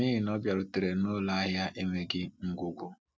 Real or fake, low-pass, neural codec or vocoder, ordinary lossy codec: real; none; none; none